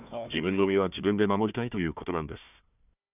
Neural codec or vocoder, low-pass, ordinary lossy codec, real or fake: codec, 16 kHz, 1 kbps, FunCodec, trained on Chinese and English, 50 frames a second; 3.6 kHz; none; fake